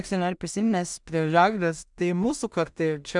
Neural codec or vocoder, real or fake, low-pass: codec, 16 kHz in and 24 kHz out, 0.4 kbps, LongCat-Audio-Codec, two codebook decoder; fake; 10.8 kHz